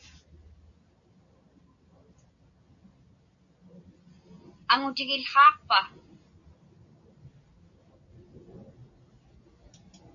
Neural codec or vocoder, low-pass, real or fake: none; 7.2 kHz; real